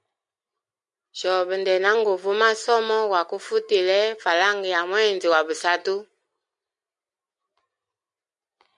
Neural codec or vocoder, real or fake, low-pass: none; real; 10.8 kHz